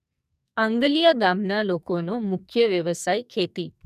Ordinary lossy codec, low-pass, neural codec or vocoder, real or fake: none; 14.4 kHz; codec, 44.1 kHz, 2.6 kbps, SNAC; fake